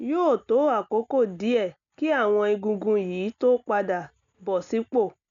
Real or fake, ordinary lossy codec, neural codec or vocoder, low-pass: real; none; none; 7.2 kHz